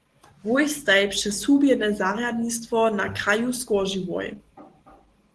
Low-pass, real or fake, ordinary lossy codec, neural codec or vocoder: 10.8 kHz; real; Opus, 16 kbps; none